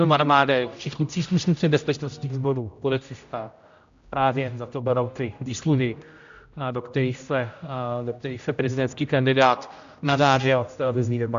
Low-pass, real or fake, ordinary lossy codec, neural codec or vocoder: 7.2 kHz; fake; AAC, 64 kbps; codec, 16 kHz, 0.5 kbps, X-Codec, HuBERT features, trained on general audio